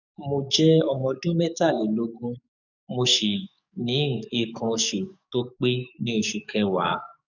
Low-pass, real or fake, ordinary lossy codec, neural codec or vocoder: 7.2 kHz; fake; none; codec, 44.1 kHz, 7.8 kbps, DAC